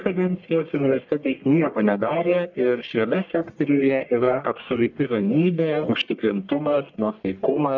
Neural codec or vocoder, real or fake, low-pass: codec, 44.1 kHz, 1.7 kbps, Pupu-Codec; fake; 7.2 kHz